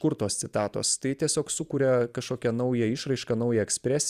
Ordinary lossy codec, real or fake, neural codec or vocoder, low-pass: Opus, 64 kbps; fake; vocoder, 44.1 kHz, 128 mel bands every 256 samples, BigVGAN v2; 14.4 kHz